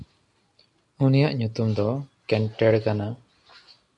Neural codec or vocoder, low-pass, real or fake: none; 10.8 kHz; real